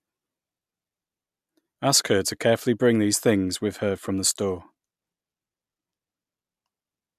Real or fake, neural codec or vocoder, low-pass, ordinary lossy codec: real; none; 14.4 kHz; MP3, 96 kbps